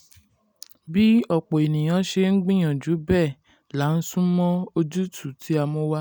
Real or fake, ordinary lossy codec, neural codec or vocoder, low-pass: real; none; none; none